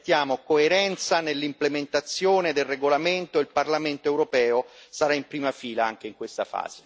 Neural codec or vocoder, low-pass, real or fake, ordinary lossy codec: none; none; real; none